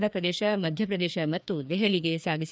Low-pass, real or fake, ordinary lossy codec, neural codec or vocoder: none; fake; none; codec, 16 kHz, 2 kbps, FreqCodec, larger model